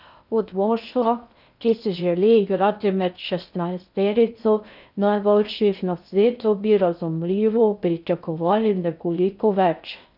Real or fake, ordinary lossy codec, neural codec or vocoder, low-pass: fake; none; codec, 16 kHz in and 24 kHz out, 0.6 kbps, FocalCodec, streaming, 2048 codes; 5.4 kHz